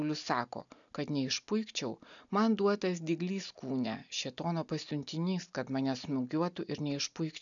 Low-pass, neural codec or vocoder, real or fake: 7.2 kHz; none; real